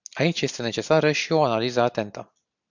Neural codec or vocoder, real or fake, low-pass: none; real; 7.2 kHz